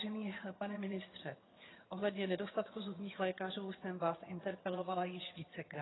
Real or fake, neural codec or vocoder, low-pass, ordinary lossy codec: fake; vocoder, 22.05 kHz, 80 mel bands, HiFi-GAN; 7.2 kHz; AAC, 16 kbps